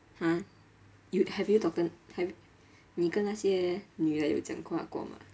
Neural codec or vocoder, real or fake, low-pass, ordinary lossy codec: none; real; none; none